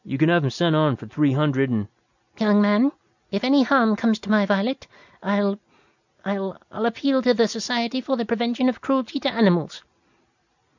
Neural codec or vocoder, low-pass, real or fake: none; 7.2 kHz; real